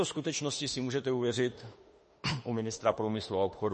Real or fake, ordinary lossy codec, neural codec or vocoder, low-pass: fake; MP3, 32 kbps; autoencoder, 48 kHz, 32 numbers a frame, DAC-VAE, trained on Japanese speech; 10.8 kHz